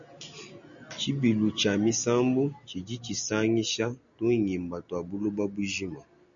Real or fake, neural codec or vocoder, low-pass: real; none; 7.2 kHz